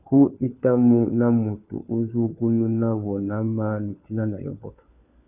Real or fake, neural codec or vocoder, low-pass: fake; codec, 16 kHz, 4 kbps, FunCodec, trained on LibriTTS, 50 frames a second; 3.6 kHz